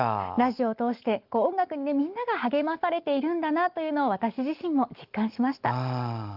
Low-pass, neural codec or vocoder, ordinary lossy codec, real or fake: 5.4 kHz; none; Opus, 32 kbps; real